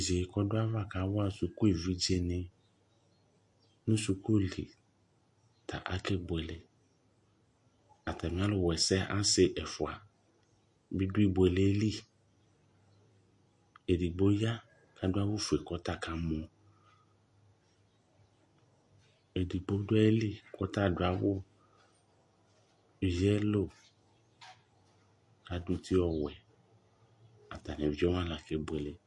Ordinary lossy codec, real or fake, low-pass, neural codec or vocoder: MP3, 48 kbps; real; 10.8 kHz; none